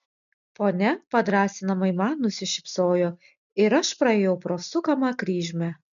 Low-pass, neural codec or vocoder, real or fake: 7.2 kHz; none; real